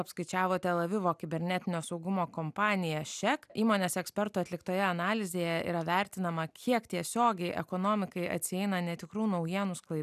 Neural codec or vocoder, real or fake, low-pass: none; real; 14.4 kHz